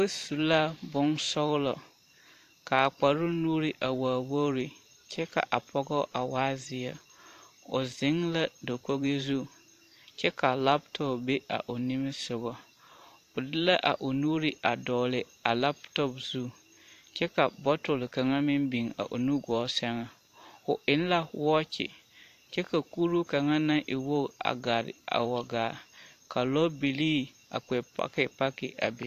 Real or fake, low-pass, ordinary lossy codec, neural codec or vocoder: fake; 14.4 kHz; AAC, 64 kbps; vocoder, 44.1 kHz, 128 mel bands every 512 samples, BigVGAN v2